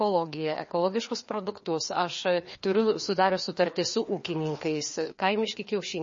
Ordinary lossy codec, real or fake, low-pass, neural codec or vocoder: MP3, 32 kbps; fake; 7.2 kHz; codec, 16 kHz, 2 kbps, FreqCodec, larger model